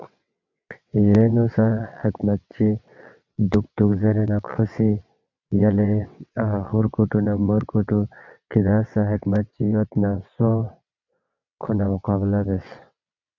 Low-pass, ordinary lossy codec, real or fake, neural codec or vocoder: 7.2 kHz; MP3, 64 kbps; fake; vocoder, 22.05 kHz, 80 mel bands, WaveNeXt